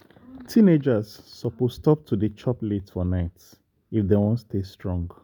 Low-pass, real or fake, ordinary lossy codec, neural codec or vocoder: 19.8 kHz; real; none; none